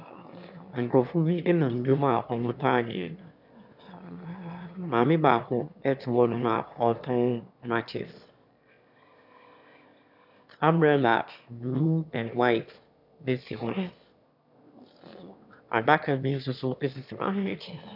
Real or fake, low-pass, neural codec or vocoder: fake; 5.4 kHz; autoencoder, 22.05 kHz, a latent of 192 numbers a frame, VITS, trained on one speaker